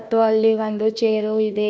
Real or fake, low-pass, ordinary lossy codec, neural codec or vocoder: fake; none; none; codec, 16 kHz, 1 kbps, FunCodec, trained on Chinese and English, 50 frames a second